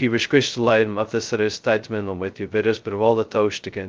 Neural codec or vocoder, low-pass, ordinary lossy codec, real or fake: codec, 16 kHz, 0.2 kbps, FocalCodec; 7.2 kHz; Opus, 24 kbps; fake